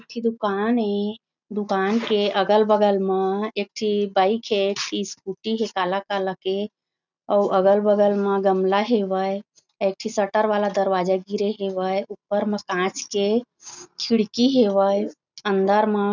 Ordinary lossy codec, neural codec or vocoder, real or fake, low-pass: none; none; real; 7.2 kHz